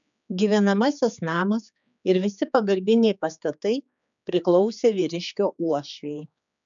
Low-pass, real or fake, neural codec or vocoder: 7.2 kHz; fake; codec, 16 kHz, 4 kbps, X-Codec, HuBERT features, trained on general audio